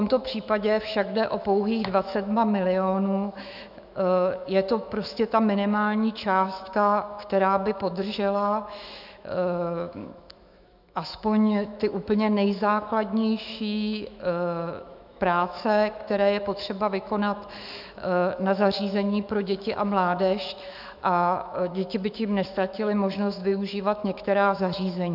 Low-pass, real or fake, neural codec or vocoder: 5.4 kHz; fake; autoencoder, 48 kHz, 128 numbers a frame, DAC-VAE, trained on Japanese speech